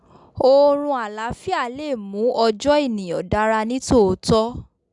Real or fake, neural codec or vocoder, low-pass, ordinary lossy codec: real; none; 10.8 kHz; none